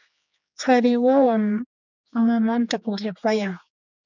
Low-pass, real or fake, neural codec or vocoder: 7.2 kHz; fake; codec, 16 kHz, 4 kbps, X-Codec, HuBERT features, trained on general audio